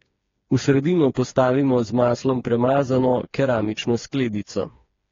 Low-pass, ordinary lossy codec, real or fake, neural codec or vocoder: 7.2 kHz; AAC, 32 kbps; fake; codec, 16 kHz, 4 kbps, FreqCodec, smaller model